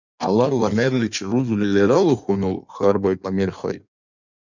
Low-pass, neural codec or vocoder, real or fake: 7.2 kHz; codec, 16 kHz in and 24 kHz out, 1.1 kbps, FireRedTTS-2 codec; fake